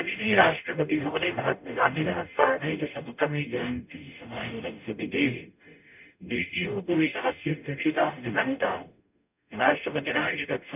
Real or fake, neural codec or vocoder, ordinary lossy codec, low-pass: fake; codec, 44.1 kHz, 0.9 kbps, DAC; none; 3.6 kHz